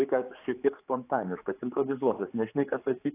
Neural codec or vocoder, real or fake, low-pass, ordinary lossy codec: codec, 16 kHz, 4 kbps, X-Codec, HuBERT features, trained on general audio; fake; 3.6 kHz; AAC, 24 kbps